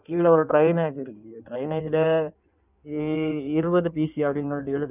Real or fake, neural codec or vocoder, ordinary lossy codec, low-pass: fake; codec, 16 kHz in and 24 kHz out, 1.1 kbps, FireRedTTS-2 codec; none; 3.6 kHz